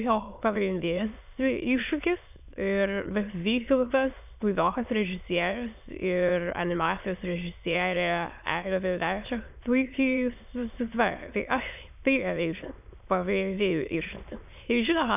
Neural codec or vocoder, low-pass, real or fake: autoencoder, 22.05 kHz, a latent of 192 numbers a frame, VITS, trained on many speakers; 3.6 kHz; fake